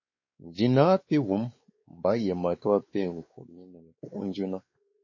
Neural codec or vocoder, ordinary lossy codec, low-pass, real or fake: codec, 16 kHz, 4 kbps, X-Codec, WavLM features, trained on Multilingual LibriSpeech; MP3, 32 kbps; 7.2 kHz; fake